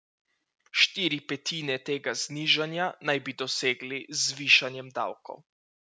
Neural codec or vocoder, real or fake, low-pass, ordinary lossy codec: none; real; none; none